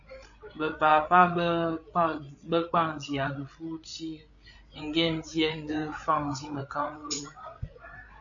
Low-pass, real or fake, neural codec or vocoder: 7.2 kHz; fake; codec, 16 kHz, 8 kbps, FreqCodec, larger model